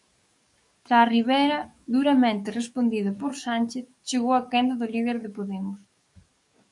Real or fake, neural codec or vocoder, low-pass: fake; codec, 44.1 kHz, 7.8 kbps, DAC; 10.8 kHz